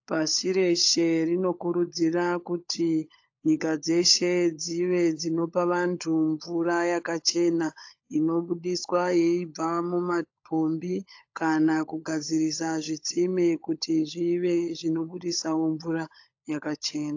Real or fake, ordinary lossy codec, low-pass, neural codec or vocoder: fake; AAC, 48 kbps; 7.2 kHz; codec, 16 kHz, 16 kbps, FunCodec, trained on LibriTTS, 50 frames a second